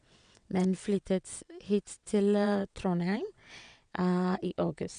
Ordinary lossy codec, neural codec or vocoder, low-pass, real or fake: MP3, 96 kbps; vocoder, 22.05 kHz, 80 mel bands, WaveNeXt; 9.9 kHz; fake